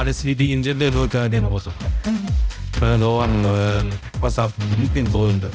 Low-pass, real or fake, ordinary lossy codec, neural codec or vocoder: none; fake; none; codec, 16 kHz, 0.5 kbps, X-Codec, HuBERT features, trained on balanced general audio